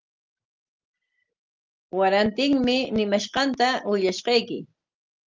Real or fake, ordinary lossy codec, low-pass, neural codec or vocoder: real; Opus, 32 kbps; 7.2 kHz; none